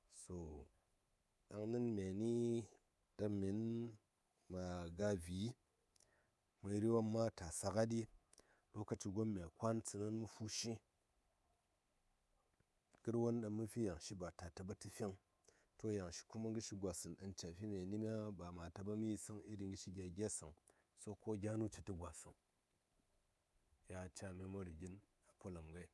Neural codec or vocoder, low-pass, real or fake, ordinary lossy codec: codec, 24 kHz, 3.1 kbps, DualCodec; none; fake; none